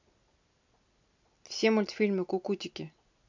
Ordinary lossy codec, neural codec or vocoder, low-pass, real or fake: MP3, 64 kbps; none; 7.2 kHz; real